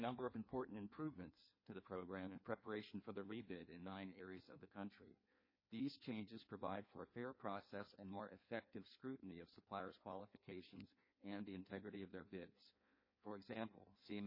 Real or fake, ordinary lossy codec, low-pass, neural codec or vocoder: fake; MP3, 24 kbps; 5.4 kHz; codec, 16 kHz in and 24 kHz out, 1.1 kbps, FireRedTTS-2 codec